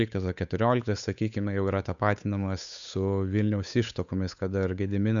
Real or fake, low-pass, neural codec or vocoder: fake; 7.2 kHz; codec, 16 kHz, 8 kbps, FunCodec, trained on Chinese and English, 25 frames a second